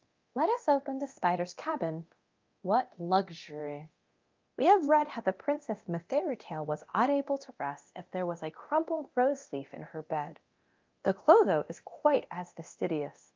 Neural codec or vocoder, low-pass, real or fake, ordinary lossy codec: codec, 24 kHz, 0.9 kbps, DualCodec; 7.2 kHz; fake; Opus, 32 kbps